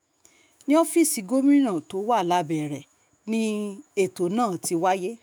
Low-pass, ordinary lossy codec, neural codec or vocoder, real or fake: none; none; autoencoder, 48 kHz, 128 numbers a frame, DAC-VAE, trained on Japanese speech; fake